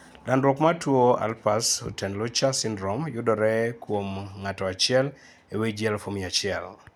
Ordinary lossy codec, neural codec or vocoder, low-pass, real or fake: none; none; 19.8 kHz; real